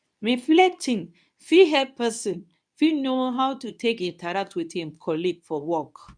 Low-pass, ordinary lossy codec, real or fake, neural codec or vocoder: 9.9 kHz; none; fake; codec, 24 kHz, 0.9 kbps, WavTokenizer, medium speech release version 2